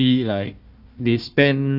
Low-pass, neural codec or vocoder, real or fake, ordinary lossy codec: 5.4 kHz; codec, 16 kHz, 1 kbps, FunCodec, trained on Chinese and English, 50 frames a second; fake; Opus, 64 kbps